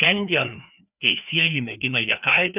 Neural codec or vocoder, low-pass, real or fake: codec, 16 kHz, 2 kbps, FreqCodec, larger model; 3.6 kHz; fake